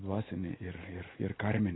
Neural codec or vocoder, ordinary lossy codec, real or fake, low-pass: none; AAC, 16 kbps; real; 7.2 kHz